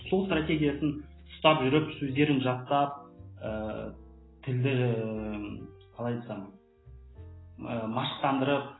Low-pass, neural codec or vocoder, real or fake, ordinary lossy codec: 7.2 kHz; none; real; AAC, 16 kbps